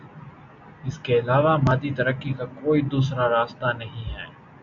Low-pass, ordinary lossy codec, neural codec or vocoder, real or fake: 7.2 kHz; AAC, 64 kbps; none; real